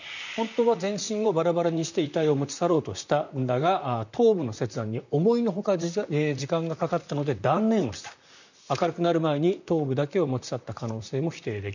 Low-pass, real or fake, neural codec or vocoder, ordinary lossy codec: 7.2 kHz; fake; vocoder, 44.1 kHz, 128 mel bands, Pupu-Vocoder; none